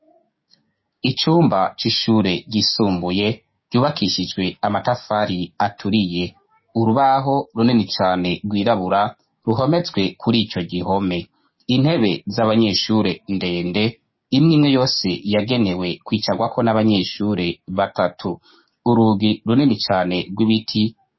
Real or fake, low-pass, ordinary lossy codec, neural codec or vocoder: fake; 7.2 kHz; MP3, 24 kbps; codec, 16 kHz, 6 kbps, DAC